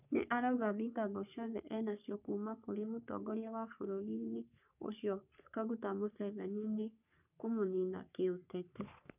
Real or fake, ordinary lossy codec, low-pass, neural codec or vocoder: fake; none; 3.6 kHz; codec, 44.1 kHz, 3.4 kbps, Pupu-Codec